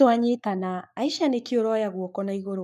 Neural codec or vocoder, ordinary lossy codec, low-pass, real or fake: codec, 44.1 kHz, 7.8 kbps, Pupu-Codec; none; 14.4 kHz; fake